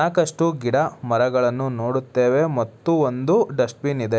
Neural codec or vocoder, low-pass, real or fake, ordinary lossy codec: none; none; real; none